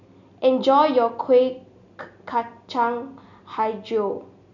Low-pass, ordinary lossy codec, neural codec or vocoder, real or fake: 7.2 kHz; none; none; real